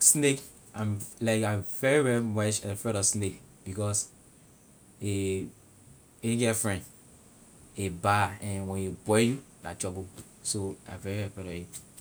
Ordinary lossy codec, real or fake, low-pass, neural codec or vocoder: none; real; none; none